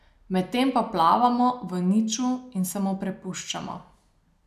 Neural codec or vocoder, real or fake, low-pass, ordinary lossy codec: none; real; 14.4 kHz; none